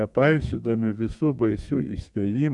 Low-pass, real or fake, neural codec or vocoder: 10.8 kHz; fake; codec, 32 kHz, 1.9 kbps, SNAC